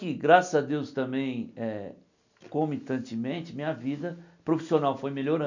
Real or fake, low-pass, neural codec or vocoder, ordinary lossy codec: real; 7.2 kHz; none; none